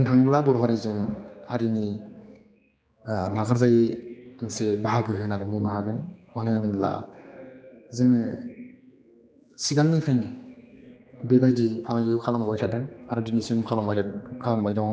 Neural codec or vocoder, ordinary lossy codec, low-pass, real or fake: codec, 16 kHz, 2 kbps, X-Codec, HuBERT features, trained on general audio; none; none; fake